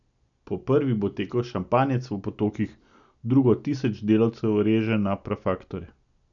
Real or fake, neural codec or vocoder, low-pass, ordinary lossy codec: real; none; 7.2 kHz; none